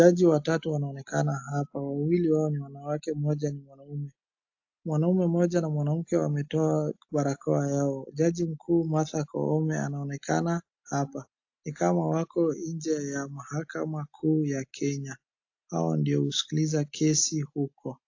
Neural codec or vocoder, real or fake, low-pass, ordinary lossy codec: none; real; 7.2 kHz; AAC, 48 kbps